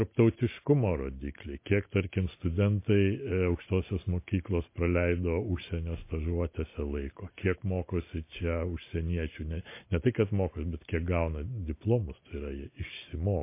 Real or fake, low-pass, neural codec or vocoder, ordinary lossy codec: real; 3.6 kHz; none; MP3, 24 kbps